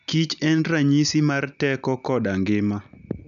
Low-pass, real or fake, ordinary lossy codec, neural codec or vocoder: 7.2 kHz; real; none; none